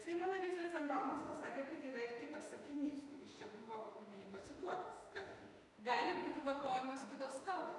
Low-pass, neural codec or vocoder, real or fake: 10.8 kHz; autoencoder, 48 kHz, 32 numbers a frame, DAC-VAE, trained on Japanese speech; fake